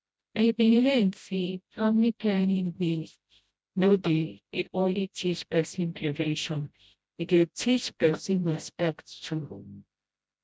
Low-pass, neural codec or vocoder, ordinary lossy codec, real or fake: none; codec, 16 kHz, 0.5 kbps, FreqCodec, smaller model; none; fake